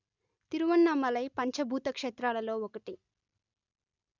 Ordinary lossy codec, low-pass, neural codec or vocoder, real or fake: none; 7.2 kHz; none; real